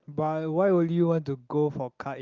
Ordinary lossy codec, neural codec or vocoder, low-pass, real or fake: Opus, 32 kbps; none; 7.2 kHz; real